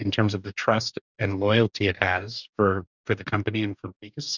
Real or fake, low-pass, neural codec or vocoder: fake; 7.2 kHz; codec, 44.1 kHz, 2.6 kbps, DAC